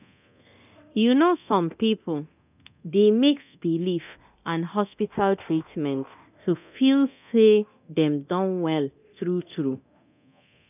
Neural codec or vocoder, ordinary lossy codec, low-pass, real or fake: codec, 24 kHz, 0.9 kbps, DualCodec; none; 3.6 kHz; fake